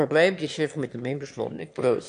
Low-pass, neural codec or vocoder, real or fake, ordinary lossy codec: 9.9 kHz; autoencoder, 22.05 kHz, a latent of 192 numbers a frame, VITS, trained on one speaker; fake; AAC, 64 kbps